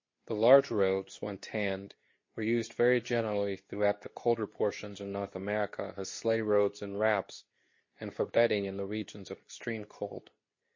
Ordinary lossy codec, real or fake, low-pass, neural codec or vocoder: MP3, 32 kbps; fake; 7.2 kHz; codec, 24 kHz, 0.9 kbps, WavTokenizer, medium speech release version 2